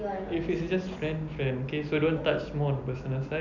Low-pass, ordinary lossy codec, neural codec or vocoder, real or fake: 7.2 kHz; none; none; real